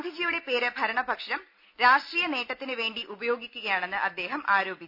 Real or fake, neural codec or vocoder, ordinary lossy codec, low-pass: real; none; none; 5.4 kHz